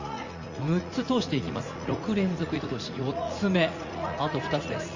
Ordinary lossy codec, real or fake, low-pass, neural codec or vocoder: Opus, 64 kbps; fake; 7.2 kHz; vocoder, 44.1 kHz, 80 mel bands, Vocos